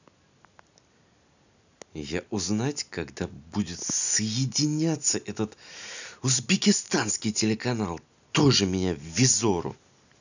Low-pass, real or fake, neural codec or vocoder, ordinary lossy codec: 7.2 kHz; real; none; none